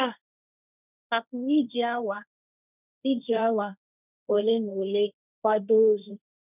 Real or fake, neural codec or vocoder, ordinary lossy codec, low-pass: fake; codec, 16 kHz, 1.1 kbps, Voila-Tokenizer; none; 3.6 kHz